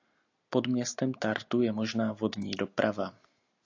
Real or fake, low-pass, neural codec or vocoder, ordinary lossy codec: real; 7.2 kHz; none; AAC, 48 kbps